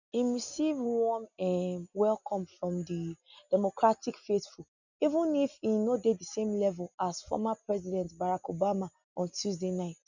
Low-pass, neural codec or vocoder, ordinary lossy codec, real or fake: 7.2 kHz; none; none; real